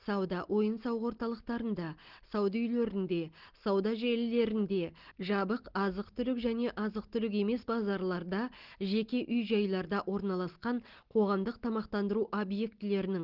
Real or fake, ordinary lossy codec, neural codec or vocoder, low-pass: real; Opus, 16 kbps; none; 5.4 kHz